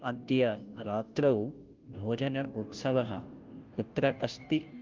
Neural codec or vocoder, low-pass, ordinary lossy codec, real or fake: codec, 16 kHz, 0.5 kbps, FunCodec, trained on Chinese and English, 25 frames a second; 7.2 kHz; Opus, 32 kbps; fake